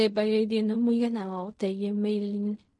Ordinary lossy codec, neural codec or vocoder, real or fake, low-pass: MP3, 48 kbps; codec, 16 kHz in and 24 kHz out, 0.4 kbps, LongCat-Audio-Codec, fine tuned four codebook decoder; fake; 10.8 kHz